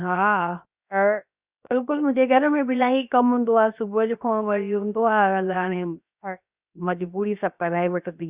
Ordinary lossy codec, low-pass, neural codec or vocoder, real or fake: Opus, 64 kbps; 3.6 kHz; codec, 16 kHz, 0.7 kbps, FocalCodec; fake